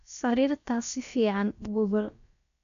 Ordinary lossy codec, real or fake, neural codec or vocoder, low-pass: none; fake; codec, 16 kHz, about 1 kbps, DyCAST, with the encoder's durations; 7.2 kHz